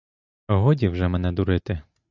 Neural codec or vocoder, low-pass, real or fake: none; 7.2 kHz; real